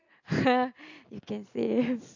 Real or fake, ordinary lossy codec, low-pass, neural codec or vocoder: real; AAC, 48 kbps; 7.2 kHz; none